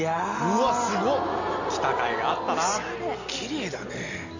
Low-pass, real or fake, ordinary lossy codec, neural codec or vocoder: 7.2 kHz; real; none; none